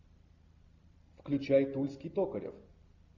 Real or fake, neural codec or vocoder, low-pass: real; none; 7.2 kHz